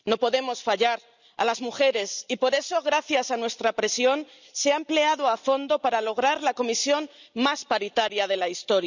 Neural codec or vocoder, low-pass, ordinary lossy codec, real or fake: none; 7.2 kHz; none; real